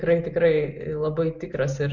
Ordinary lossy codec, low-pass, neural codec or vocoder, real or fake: MP3, 64 kbps; 7.2 kHz; none; real